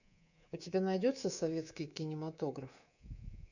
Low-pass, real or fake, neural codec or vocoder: 7.2 kHz; fake; codec, 24 kHz, 3.1 kbps, DualCodec